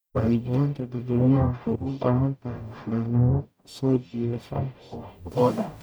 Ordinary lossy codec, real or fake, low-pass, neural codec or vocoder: none; fake; none; codec, 44.1 kHz, 0.9 kbps, DAC